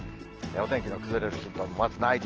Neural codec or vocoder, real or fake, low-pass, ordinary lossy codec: none; real; 7.2 kHz; Opus, 16 kbps